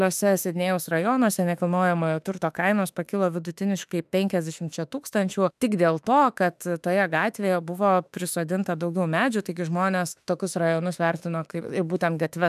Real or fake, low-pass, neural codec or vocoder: fake; 14.4 kHz; autoencoder, 48 kHz, 32 numbers a frame, DAC-VAE, trained on Japanese speech